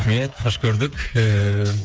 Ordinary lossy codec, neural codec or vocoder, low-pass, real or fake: none; codec, 16 kHz, 8 kbps, FreqCodec, smaller model; none; fake